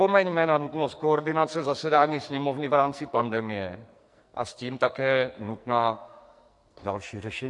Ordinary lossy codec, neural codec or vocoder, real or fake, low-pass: AAC, 64 kbps; codec, 44.1 kHz, 2.6 kbps, SNAC; fake; 10.8 kHz